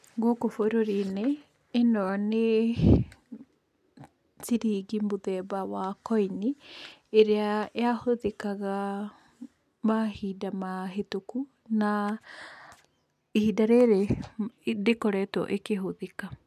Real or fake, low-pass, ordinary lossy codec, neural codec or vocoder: real; 14.4 kHz; none; none